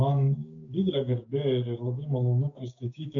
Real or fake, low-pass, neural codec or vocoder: fake; 7.2 kHz; codec, 16 kHz, 6 kbps, DAC